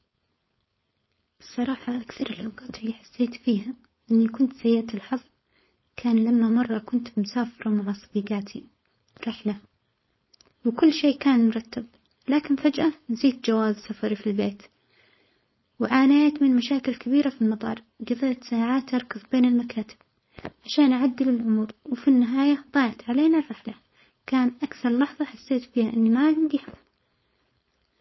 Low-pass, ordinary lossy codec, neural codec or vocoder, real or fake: 7.2 kHz; MP3, 24 kbps; codec, 16 kHz, 4.8 kbps, FACodec; fake